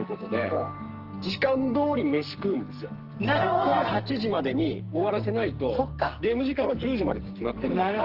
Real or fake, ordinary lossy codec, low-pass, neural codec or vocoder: fake; Opus, 16 kbps; 5.4 kHz; codec, 44.1 kHz, 2.6 kbps, SNAC